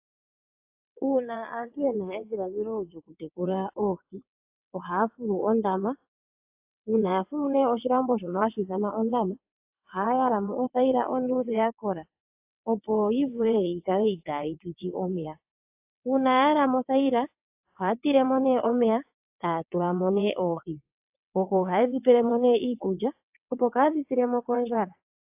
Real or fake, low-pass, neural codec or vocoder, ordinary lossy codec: fake; 3.6 kHz; vocoder, 22.05 kHz, 80 mel bands, WaveNeXt; AAC, 32 kbps